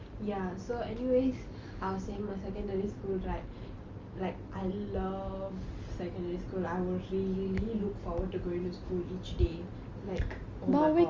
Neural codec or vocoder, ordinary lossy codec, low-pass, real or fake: none; Opus, 32 kbps; 7.2 kHz; real